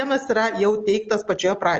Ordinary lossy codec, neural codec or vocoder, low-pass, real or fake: Opus, 24 kbps; none; 7.2 kHz; real